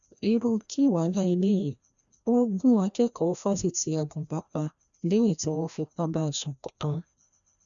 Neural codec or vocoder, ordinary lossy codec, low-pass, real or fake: codec, 16 kHz, 1 kbps, FreqCodec, larger model; none; 7.2 kHz; fake